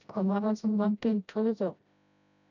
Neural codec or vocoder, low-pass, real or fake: codec, 16 kHz, 0.5 kbps, FreqCodec, smaller model; 7.2 kHz; fake